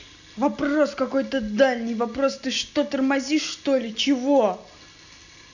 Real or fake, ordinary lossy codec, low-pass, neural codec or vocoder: real; none; 7.2 kHz; none